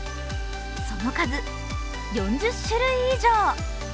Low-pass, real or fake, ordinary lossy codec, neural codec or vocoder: none; real; none; none